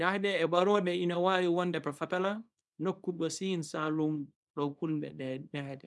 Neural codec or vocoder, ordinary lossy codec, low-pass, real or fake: codec, 24 kHz, 0.9 kbps, WavTokenizer, small release; none; none; fake